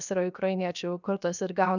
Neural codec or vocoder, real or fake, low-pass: codec, 16 kHz, 0.7 kbps, FocalCodec; fake; 7.2 kHz